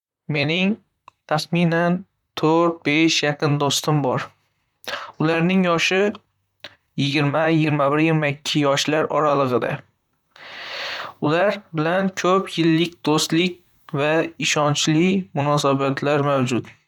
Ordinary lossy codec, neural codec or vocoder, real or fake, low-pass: none; vocoder, 44.1 kHz, 128 mel bands, Pupu-Vocoder; fake; 19.8 kHz